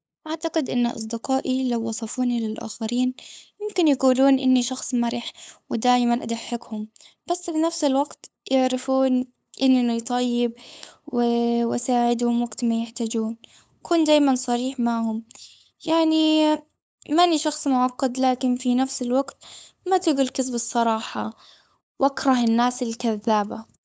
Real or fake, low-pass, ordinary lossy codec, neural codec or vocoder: fake; none; none; codec, 16 kHz, 8 kbps, FunCodec, trained on LibriTTS, 25 frames a second